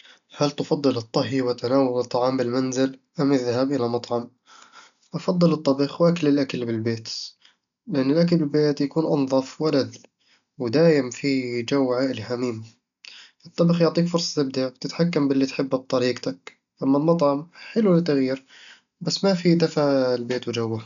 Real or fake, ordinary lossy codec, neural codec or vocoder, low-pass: real; MP3, 96 kbps; none; 7.2 kHz